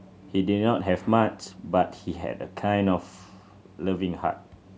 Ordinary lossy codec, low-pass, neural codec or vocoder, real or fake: none; none; none; real